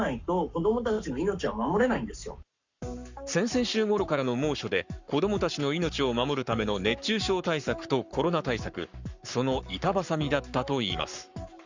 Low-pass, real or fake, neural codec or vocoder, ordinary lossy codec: 7.2 kHz; fake; codec, 44.1 kHz, 7.8 kbps, Pupu-Codec; Opus, 64 kbps